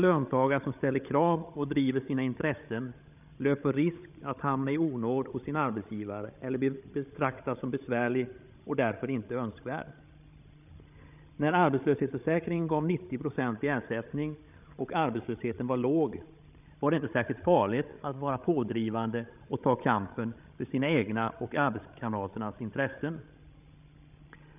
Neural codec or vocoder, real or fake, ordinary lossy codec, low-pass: codec, 16 kHz, 16 kbps, FreqCodec, larger model; fake; none; 3.6 kHz